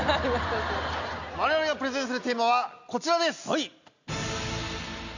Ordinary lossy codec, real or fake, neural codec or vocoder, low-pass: none; real; none; 7.2 kHz